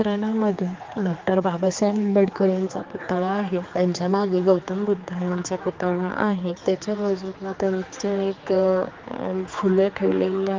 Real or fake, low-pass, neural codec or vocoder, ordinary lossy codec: fake; 7.2 kHz; codec, 16 kHz, 4 kbps, X-Codec, HuBERT features, trained on general audio; Opus, 24 kbps